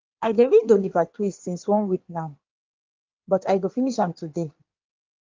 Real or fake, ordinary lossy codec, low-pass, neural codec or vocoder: fake; Opus, 24 kbps; 7.2 kHz; codec, 16 kHz in and 24 kHz out, 1.1 kbps, FireRedTTS-2 codec